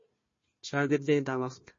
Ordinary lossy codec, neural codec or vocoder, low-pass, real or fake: MP3, 32 kbps; codec, 16 kHz, 1 kbps, FunCodec, trained on Chinese and English, 50 frames a second; 7.2 kHz; fake